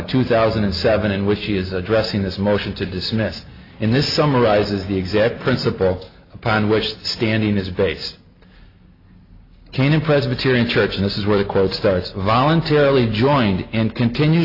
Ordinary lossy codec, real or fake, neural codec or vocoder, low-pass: AAC, 32 kbps; real; none; 5.4 kHz